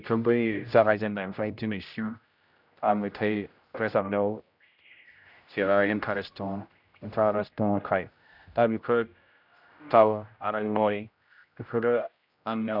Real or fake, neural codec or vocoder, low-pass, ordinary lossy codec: fake; codec, 16 kHz, 0.5 kbps, X-Codec, HuBERT features, trained on general audio; 5.4 kHz; none